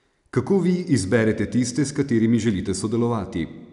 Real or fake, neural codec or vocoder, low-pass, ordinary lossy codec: real; none; 10.8 kHz; none